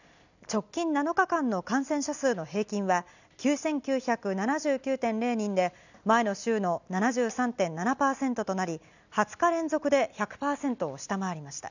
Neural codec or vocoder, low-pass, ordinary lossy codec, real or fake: none; 7.2 kHz; none; real